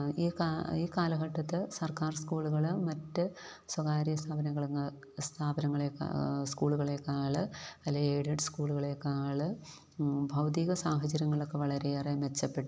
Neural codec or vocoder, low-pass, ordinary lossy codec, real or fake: none; none; none; real